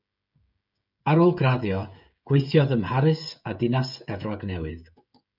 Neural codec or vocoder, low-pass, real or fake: codec, 16 kHz, 16 kbps, FreqCodec, smaller model; 5.4 kHz; fake